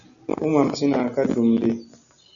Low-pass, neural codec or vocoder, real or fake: 7.2 kHz; none; real